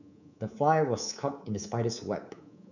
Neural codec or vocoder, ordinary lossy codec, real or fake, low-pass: codec, 24 kHz, 3.1 kbps, DualCodec; none; fake; 7.2 kHz